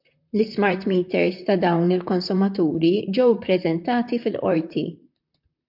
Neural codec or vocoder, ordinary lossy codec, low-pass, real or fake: vocoder, 44.1 kHz, 128 mel bands, Pupu-Vocoder; MP3, 48 kbps; 5.4 kHz; fake